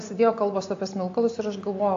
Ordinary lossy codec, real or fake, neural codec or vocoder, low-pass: AAC, 48 kbps; real; none; 7.2 kHz